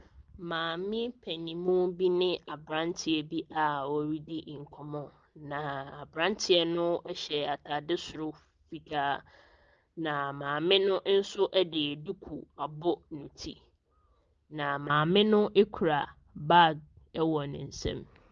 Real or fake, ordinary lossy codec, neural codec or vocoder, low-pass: real; Opus, 24 kbps; none; 7.2 kHz